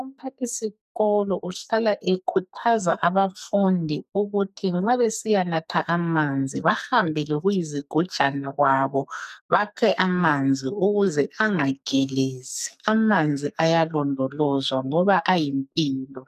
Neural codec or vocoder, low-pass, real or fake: codec, 44.1 kHz, 2.6 kbps, SNAC; 14.4 kHz; fake